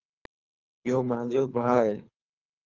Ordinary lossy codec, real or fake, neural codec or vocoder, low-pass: Opus, 24 kbps; fake; codec, 24 kHz, 3 kbps, HILCodec; 7.2 kHz